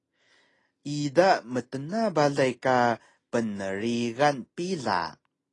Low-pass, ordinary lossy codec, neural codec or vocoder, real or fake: 10.8 kHz; AAC, 32 kbps; none; real